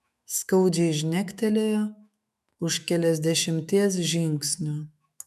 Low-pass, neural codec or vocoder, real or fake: 14.4 kHz; autoencoder, 48 kHz, 128 numbers a frame, DAC-VAE, trained on Japanese speech; fake